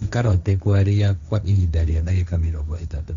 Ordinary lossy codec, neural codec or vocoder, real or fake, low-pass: none; codec, 16 kHz, 1.1 kbps, Voila-Tokenizer; fake; 7.2 kHz